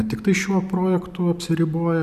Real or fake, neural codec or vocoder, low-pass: real; none; 14.4 kHz